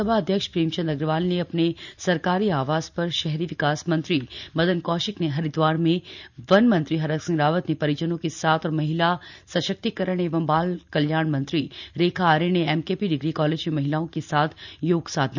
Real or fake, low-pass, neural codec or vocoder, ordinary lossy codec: real; 7.2 kHz; none; none